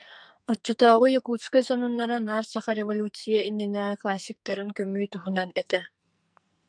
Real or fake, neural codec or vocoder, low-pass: fake; codec, 44.1 kHz, 2.6 kbps, SNAC; 9.9 kHz